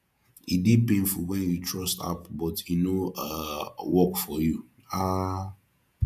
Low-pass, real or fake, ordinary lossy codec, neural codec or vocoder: 14.4 kHz; fake; none; vocoder, 48 kHz, 128 mel bands, Vocos